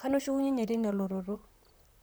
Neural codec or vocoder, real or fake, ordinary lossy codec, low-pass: vocoder, 44.1 kHz, 128 mel bands, Pupu-Vocoder; fake; none; none